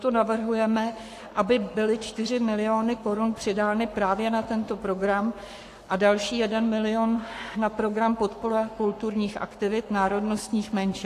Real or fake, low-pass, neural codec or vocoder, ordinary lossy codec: fake; 14.4 kHz; codec, 44.1 kHz, 7.8 kbps, Pupu-Codec; AAC, 64 kbps